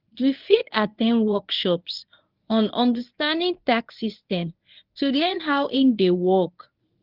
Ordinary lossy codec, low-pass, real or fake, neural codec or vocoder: Opus, 32 kbps; 5.4 kHz; fake; codec, 24 kHz, 0.9 kbps, WavTokenizer, medium speech release version 1